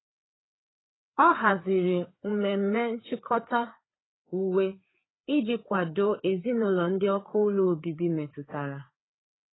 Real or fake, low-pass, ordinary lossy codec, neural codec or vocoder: fake; 7.2 kHz; AAC, 16 kbps; codec, 16 kHz, 4 kbps, FreqCodec, larger model